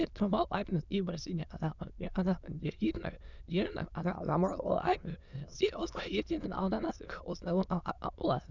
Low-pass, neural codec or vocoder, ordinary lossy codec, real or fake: 7.2 kHz; autoencoder, 22.05 kHz, a latent of 192 numbers a frame, VITS, trained on many speakers; none; fake